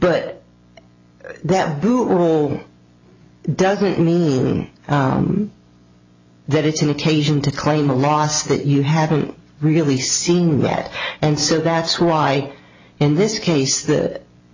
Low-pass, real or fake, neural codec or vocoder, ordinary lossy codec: 7.2 kHz; real; none; AAC, 48 kbps